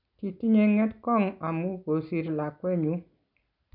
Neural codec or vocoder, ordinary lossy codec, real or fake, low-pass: none; none; real; 5.4 kHz